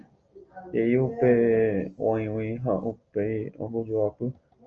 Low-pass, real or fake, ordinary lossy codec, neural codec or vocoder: 7.2 kHz; real; Opus, 24 kbps; none